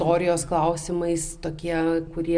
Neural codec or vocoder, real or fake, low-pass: none; real; 9.9 kHz